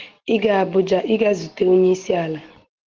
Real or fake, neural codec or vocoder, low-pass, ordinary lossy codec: real; none; 7.2 kHz; Opus, 16 kbps